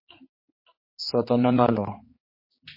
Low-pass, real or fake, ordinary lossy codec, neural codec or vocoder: 5.4 kHz; fake; MP3, 24 kbps; codec, 16 kHz, 4 kbps, X-Codec, HuBERT features, trained on general audio